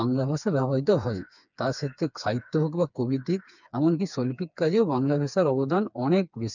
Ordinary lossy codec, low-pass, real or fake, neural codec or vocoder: none; 7.2 kHz; fake; codec, 16 kHz, 4 kbps, FreqCodec, smaller model